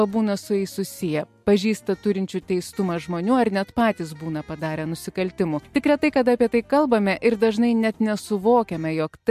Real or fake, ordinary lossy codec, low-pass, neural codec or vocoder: real; MP3, 64 kbps; 14.4 kHz; none